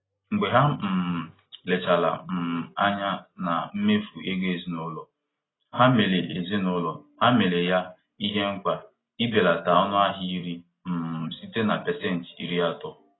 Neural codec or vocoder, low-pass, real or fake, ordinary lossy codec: none; 7.2 kHz; real; AAC, 16 kbps